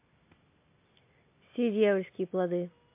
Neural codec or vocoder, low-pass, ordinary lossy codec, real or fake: none; 3.6 kHz; MP3, 24 kbps; real